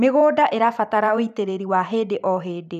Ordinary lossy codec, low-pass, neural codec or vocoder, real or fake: none; 14.4 kHz; vocoder, 44.1 kHz, 128 mel bands every 512 samples, BigVGAN v2; fake